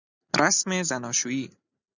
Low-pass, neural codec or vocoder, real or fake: 7.2 kHz; none; real